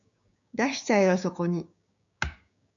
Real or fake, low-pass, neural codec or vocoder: fake; 7.2 kHz; codec, 16 kHz, 6 kbps, DAC